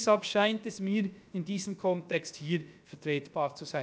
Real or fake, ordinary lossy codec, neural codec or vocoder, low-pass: fake; none; codec, 16 kHz, about 1 kbps, DyCAST, with the encoder's durations; none